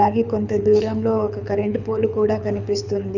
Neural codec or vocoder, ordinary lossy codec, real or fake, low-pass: codec, 24 kHz, 6 kbps, HILCodec; none; fake; 7.2 kHz